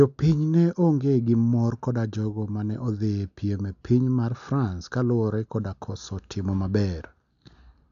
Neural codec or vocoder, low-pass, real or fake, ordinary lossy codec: none; 7.2 kHz; real; none